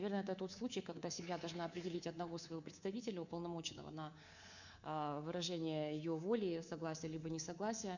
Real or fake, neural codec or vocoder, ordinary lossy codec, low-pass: fake; codec, 24 kHz, 3.1 kbps, DualCodec; none; 7.2 kHz